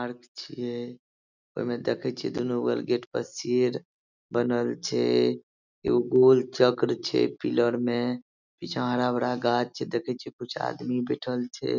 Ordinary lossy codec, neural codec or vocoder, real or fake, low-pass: none; none; real; 7.2 kHz